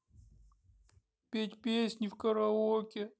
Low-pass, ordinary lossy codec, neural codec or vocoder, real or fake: none; none; none; real